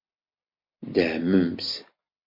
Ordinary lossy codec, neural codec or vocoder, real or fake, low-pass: MP3, 32 kbps; none; real; 5.4 kHz